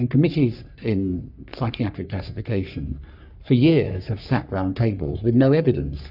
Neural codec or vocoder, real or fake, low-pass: codec, 44.1 kHz, 3.4 kbps, Pupu-Codec; fake; 5.4 kHz